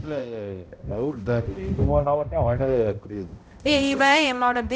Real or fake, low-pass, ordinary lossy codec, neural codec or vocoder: fake; none; none; codec, 16 kHz, 0.5 kbps, X-Codec, HuBERT features, trained on balanced general audio